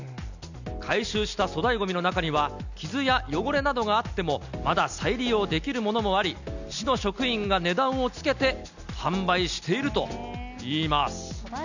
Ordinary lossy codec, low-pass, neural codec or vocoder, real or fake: none; 7.2 kHz; none; real